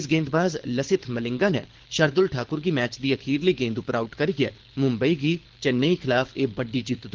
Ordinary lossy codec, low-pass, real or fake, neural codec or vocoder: Opus, 16 kbps; 7.2 kHz; fake; codec, 24 kHz, 6 kbps, HILCodec